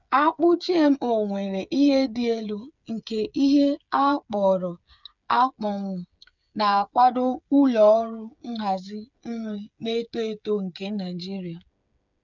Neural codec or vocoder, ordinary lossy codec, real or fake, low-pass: codec, 16 kHz, 8 kbps, FreqCodec, smaller model; none; fake; 7.2 kHz